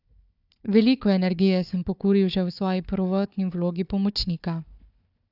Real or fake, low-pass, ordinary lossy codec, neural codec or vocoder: fake; 5.4 kHz; none; codec, 16 kHz, 4 kbps, FunCodec, trained on Chinese and English, 50 frames a second